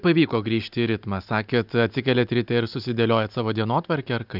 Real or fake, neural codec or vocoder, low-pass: real; none; 5.4 kHz